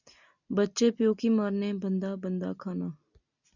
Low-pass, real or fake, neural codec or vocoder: 7.2 kHz; real; none